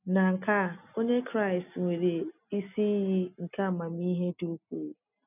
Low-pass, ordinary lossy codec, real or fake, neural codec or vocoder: 3.6 kHz; none; real; none